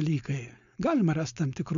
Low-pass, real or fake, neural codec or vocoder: 7.2 kHz; fake; codec, 16 kHz, 4.8 kbps, FACodec